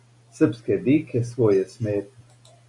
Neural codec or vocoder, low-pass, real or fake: none; 10.8 kHz; real